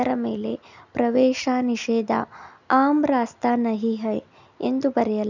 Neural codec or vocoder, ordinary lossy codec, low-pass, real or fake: none; none; 7.2 kHz; real